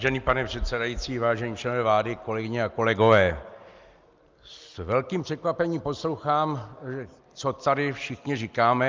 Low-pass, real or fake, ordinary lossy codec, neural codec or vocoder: 7.2 kHz; real; Opus, 24 kbps; none